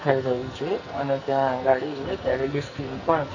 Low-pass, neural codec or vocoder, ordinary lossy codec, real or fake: 7.2 kHz; codec, 44.1 kHz, 2.6 kbps, SNAC; none; fake